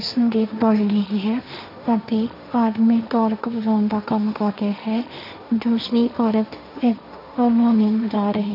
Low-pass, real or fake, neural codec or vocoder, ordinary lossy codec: 5.4 kHz; fake; codec, 16 kHz, 1.1 kbps, Voila-Tokenizer; none